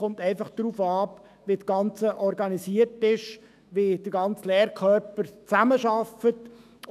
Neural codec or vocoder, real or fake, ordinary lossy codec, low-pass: autoencoder, 48 kHz, 128 numbers a frame, DAC-VAE, trained on Japanese speech; fake; none; 14.4 kHz